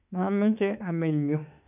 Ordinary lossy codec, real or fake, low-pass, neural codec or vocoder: none; fake; 3.6 kHz; autoencoder, 48 kHz, 32 numbers a frame, DAC-VAE, trained on Japanese speech